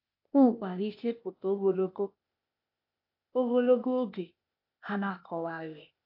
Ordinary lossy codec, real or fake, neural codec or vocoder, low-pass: AAC, 48 kbps; fake; codec, 16 kHz, 0.8 kbps, ZipCodec; 5.4 kHz